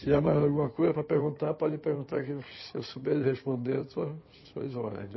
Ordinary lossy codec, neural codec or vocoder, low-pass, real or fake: MP3, 24 kbps; codec, 16 kHz in and 24 kHz out, 2.2 kbps, FireRedTTS-2 codec; 7.2 kHz; fake